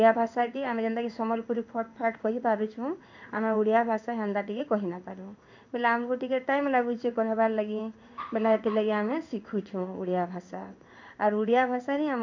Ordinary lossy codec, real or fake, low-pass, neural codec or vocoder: MP3, 64 kbps; fake; 7.2 kHz; codec, 16 kHz in and 24 kHz out, 1 kbps, XY-Tokenizer